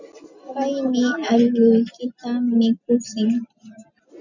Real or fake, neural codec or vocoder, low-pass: real; none; 7.2 kHz